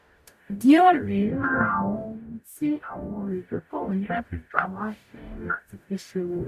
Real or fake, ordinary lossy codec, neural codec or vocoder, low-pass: fake; none; codec, 44.1 kHz, 0.9 kbps, DAC; 14.4 kHz